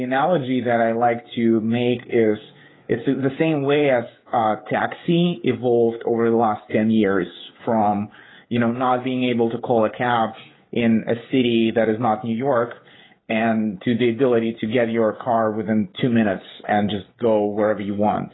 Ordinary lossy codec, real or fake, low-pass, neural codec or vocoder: AAC, 16 kbps; fake; 7.2 kHz; codec, 16 kHz, 16 kbps, FreqCodec, smaller model